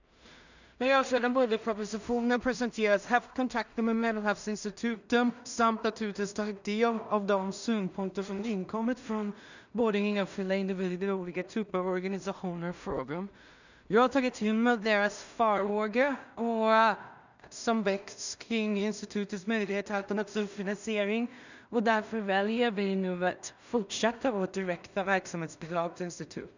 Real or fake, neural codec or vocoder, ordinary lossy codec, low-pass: fake; codec, 16 kHz in and 24 kHz out, 0.4 kbps, LongCat-Audio-Codec, two codebook decoder; none; 7.2 kHz